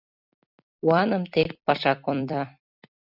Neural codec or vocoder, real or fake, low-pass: vocoder, 44.1 kHz, 128 mel bands every 256 samples, BigVGAN v2; fake; 5.4 kHz